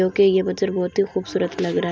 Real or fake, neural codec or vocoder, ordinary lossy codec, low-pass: real; none; none; none